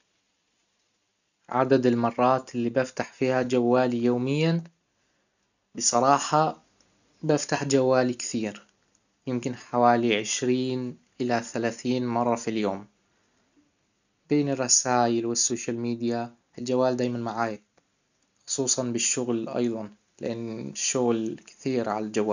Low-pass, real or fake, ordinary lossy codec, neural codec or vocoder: 7.2 kHz; real; none; none